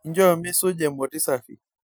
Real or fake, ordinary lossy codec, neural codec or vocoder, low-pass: real; none; none; none